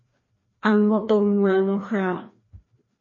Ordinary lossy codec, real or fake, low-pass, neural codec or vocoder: MP3, 32 kbps; fake; 7.2 kHz; codec, 16 kHz, 1 kbps, FreqCodec, larger model